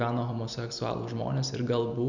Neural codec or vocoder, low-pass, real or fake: none; 7.2 kHz; real